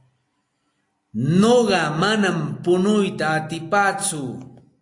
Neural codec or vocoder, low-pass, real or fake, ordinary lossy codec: none; 10.8 kHz; real; AAC, 48 kbps